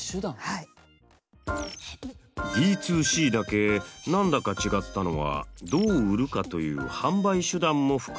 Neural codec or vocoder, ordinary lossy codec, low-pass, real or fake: none; none; none; real